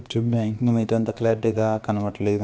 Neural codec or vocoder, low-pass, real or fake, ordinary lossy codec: codec, 16 kHz, about 1 kbps, DyCAST, with the encoder's durations; none; fake; none